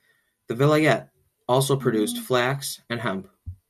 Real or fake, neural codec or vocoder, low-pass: real; none; 14.4 kHz